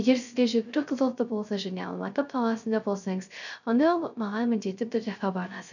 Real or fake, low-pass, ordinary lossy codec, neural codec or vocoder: fake; 7.2 kHz; none; codec, 16 kHz, 0.3 kbps, FocalCodec